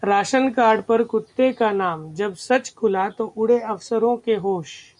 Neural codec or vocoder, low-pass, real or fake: none; 10.8 kHz; real